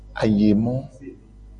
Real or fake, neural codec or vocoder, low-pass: real; none; 9.9 kHz